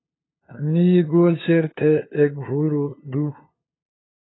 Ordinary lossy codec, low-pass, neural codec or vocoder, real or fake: AAC, 16 kbps; 7.2 kHz; codec, 16 kHz, 2 kbps, FunCodec, trained on LibriTTS, 25 frames a second; fake